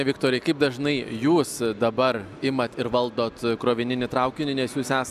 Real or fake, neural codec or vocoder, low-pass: real; none; 14.4 kHz